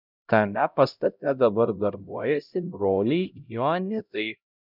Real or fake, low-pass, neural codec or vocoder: fake; 5.4 kHz; codec, 16 kHz, 0.5 kbps, X-Codec, HuBERT features, trained on LibriSpeech